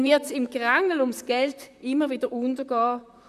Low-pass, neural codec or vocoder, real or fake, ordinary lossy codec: 14.4 kHz; vocoder, 44.1 kHz, 128 mel bands, Pupu-Vocoder; fake; none